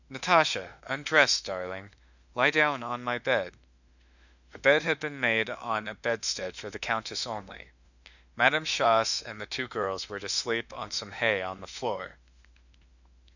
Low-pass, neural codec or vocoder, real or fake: 7.2 kHz; autoencoder, 48 kHz, 32 numbers a frame, DAC-VAE, trained on Japanese speech; fake